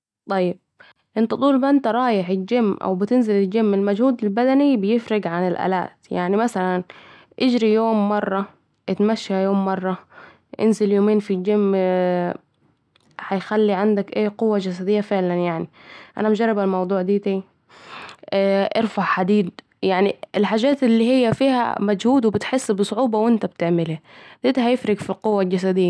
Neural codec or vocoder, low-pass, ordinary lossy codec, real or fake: none; none; none; real